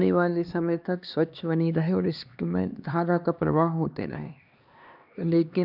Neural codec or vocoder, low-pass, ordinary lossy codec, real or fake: codec, 16 kHz, 2 kbps, X-Codec, HuBERT features, trained on LibriSpeech; 5.4 kHz; none; fake